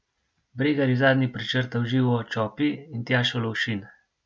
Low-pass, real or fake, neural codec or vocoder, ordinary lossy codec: none; real; none; none